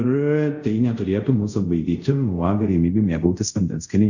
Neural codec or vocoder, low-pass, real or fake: codec, 24 kHz, 0.5 kbps, DualCodec; 7.2 kHz; fake